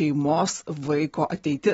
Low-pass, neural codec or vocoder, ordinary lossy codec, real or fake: 19.8 kHz; vocoder, 44.1 kHz, 128 mel bands every 256 samples, BigVGAN v2; AAC, 24 kbps; fake